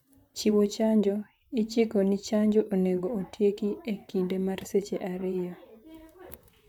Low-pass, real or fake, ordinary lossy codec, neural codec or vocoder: 19.8 kHz; fake; none; vocoder, 44.1 kHz, 128 mel bands, Pupu-Vocoder